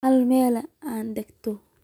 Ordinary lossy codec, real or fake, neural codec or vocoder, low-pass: none; real; none; 19.8 kHz